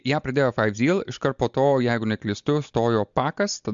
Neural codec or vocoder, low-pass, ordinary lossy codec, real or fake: none; 7.2 kHz; MP3, 64 kbps; real